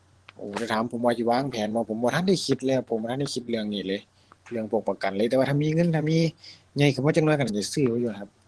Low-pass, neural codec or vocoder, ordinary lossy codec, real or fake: 10.8 kHz; vocoder, 24 kHz, 100 mel bands, Vocos; Opus, 16 kbps; fake